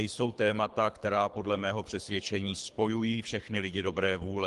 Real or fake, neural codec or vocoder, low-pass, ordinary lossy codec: fake; codec, 24 kHz, 3 kbps, HILCodec; 10.8 kHz; Opus, 24 kbps